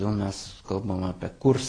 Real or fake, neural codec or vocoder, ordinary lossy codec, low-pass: real; none; AAC, 32 kbps; 9.9 kHz